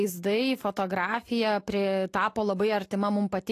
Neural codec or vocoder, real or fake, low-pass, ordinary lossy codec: none; real; 14.4 kHz; AAC, 48 kbps